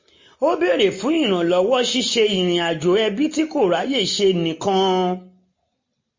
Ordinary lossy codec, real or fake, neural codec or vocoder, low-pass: MP3, 32 kbps; real; none; 7.2 kHz